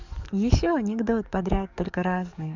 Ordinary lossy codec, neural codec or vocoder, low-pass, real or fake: none; codec, 44.1 kHz, 7.8 kbps, DAC; 7.2 kHz; fake